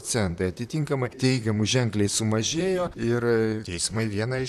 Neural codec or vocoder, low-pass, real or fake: vocoder, 44.1 kHz, 128 mel bands, Pupu-Vocoder; 14.4 kHz; fake